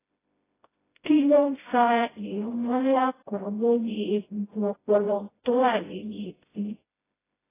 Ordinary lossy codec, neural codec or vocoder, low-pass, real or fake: AAC, 16 kbps; codec, 16 kHz, 0.5 kbps, FreqCodec, smaller model; 3.6 kHz; fake